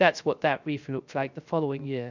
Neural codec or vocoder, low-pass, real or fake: codec, 16 kHz, 0.3 kbps, FocalCodec; 7.2 kHz; fake